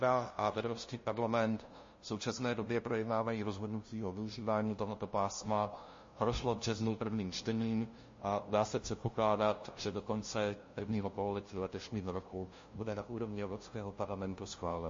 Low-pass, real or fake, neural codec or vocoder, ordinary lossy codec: 7.2 kHz; fake; codec, 16 kHz, 0.5 kbps, FunCodec, trained on LibriTTS, 25 frames a second; MP3, 32 kbps